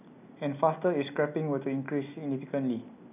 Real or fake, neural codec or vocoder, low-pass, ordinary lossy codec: real; none; 3.6 kHz; none